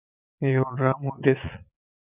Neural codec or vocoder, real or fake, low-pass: vocoder, 22.05 kHz, 80 mel bands, Vocos; fake; 3.6 kHz